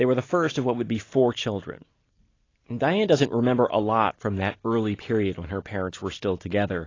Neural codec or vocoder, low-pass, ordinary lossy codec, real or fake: none; 7.2 kHz; AAC, 32 kbps; real